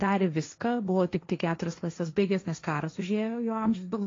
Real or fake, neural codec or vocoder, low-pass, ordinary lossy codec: fake; codec, 16 kHz, 1.1 kbps, Voila-Tokenizer; 7.2 kHz; AAC, 32 kbps